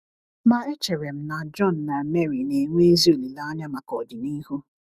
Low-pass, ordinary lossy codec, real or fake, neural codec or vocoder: 14.4 kHz; Opus, 64 kbps; fake; autoencoder, 48 kHz, 128 numbers a frame, DAC-VAE, trained on Japanese speech